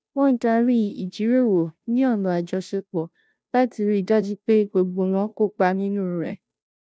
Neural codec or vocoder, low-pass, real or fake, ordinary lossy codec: codec, 16 kHz, 0.5 kbps, FunCodec, trained on Chinese and English, 25 frames a second; none; fake; none